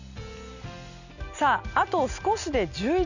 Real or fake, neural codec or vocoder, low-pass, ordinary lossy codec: real; none; 7.2 kHz; none